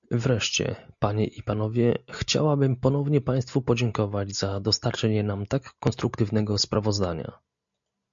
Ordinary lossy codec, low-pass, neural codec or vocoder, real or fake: MP3, 64 kbps; 7.2 kHz; none; real